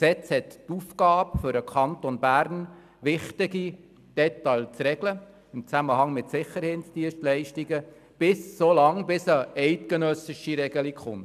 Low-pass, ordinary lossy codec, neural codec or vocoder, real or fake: 14.4 kHz; none; none; real